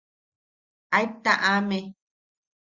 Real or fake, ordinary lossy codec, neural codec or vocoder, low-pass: real; Opus, 64 kbps; none; 7.2 kHz